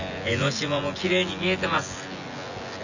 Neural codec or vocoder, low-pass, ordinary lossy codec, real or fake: vocoder, 24 kHz, 100 mel bands, Vocos; 7.2 kHz; none; fake